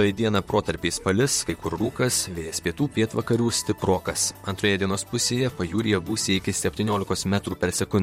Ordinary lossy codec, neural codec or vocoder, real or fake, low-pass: MP3, 64 kbps; vocoder, 44.1 kHz, 128 mel bands, Pupu-Vocoder; fake; 19.8 kHz